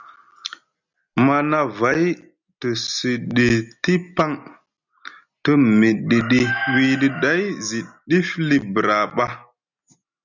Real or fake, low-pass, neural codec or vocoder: real; 7.2 kHz; none